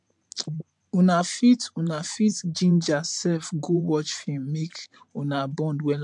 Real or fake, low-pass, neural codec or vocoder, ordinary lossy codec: fake; 10.8 kHz; vocoder, 44.1 kHz, 128 mel bands every 512 samples, BigVGAN v2; MP3, 64 kbps